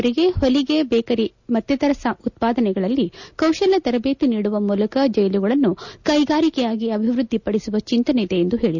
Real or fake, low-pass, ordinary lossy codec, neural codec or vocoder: real; 7.2 kHz; none; none